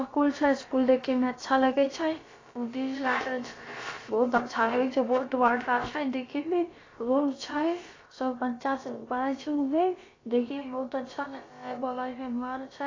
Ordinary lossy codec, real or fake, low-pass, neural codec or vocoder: AAC, 32 kbps; fake; 7.2 kHz; codec, 16 kHz, about 1 kbps, DyCAST, with the encoder's durations